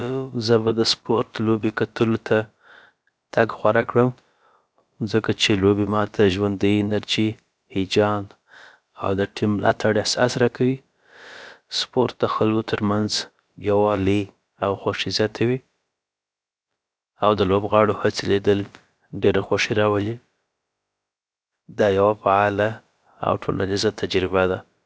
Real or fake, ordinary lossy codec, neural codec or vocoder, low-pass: fake; none; codec, 16 kHz, about 1 kbps, DyCAST, with the encoder's durations; none